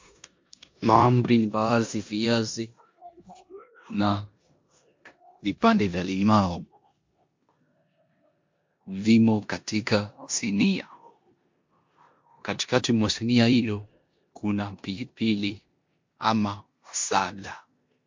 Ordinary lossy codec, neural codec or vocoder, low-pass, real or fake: MP3, 48 kbps; codec, 16 kHz in and 24 kHz out, 0.9 kbps, LongCat-Audio-Codec, four codebook decoder; 7.2 kHz; fake